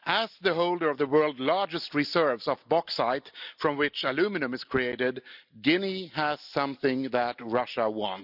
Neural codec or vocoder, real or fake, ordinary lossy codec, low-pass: none; real; none; 5.4 kHz